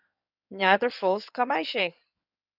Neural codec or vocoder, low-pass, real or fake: codec, 16 kHz in and 24 kHz out, 2.2 kbps, FireRedTTS-2 codec; 5.4 kHz; fake